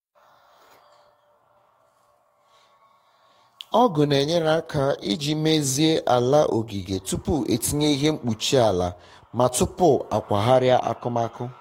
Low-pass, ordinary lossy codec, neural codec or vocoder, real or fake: 19.8 kHz; AAC, 48 kbps; codec, 44.1 kHz, 7.8 kbps, DAC; fake